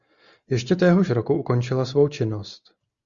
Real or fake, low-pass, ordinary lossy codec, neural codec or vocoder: real; 7.2 kHz; Opus, 64 kbps; none